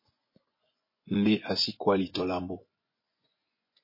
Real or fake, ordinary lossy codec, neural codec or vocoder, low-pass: fake; MP3, 24 kbps; vocoder, 24 kHz, 100 mel bands, Vocos; 5.4 kHz